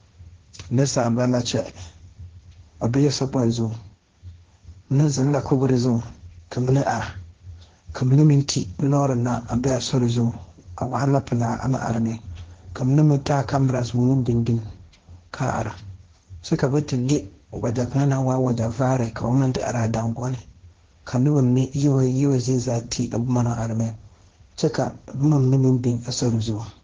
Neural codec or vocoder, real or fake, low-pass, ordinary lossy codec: codec, 16 kHz, 1.1 kbps, Voila-Tokenizer; fake; 7.2 kHz; Opus, 16 kbps